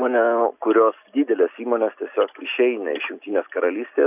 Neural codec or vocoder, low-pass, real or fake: none; 3.6 kHz; real